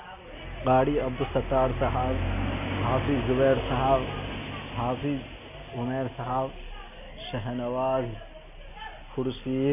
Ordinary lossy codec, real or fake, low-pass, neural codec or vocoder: none; real; 3.6 kHz; none